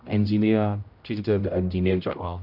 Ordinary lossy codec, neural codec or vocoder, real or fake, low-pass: none; codec, 16 kHz, 0.5 kbps, X-Codec, HuBERT features, trained on general audio; fake; 5.4 kHz